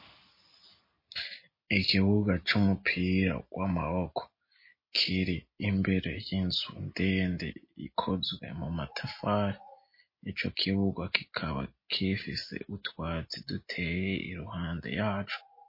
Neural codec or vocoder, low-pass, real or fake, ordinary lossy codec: none; 5.4 kHz; real; MP3, 24 kbps